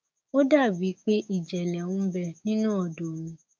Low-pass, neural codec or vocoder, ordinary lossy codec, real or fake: none; codec, 16 kHz, 6 kbps, DAC; none; fake